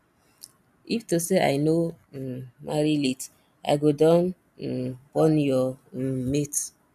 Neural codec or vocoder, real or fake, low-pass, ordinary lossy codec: none; real; 14.4 kHz; none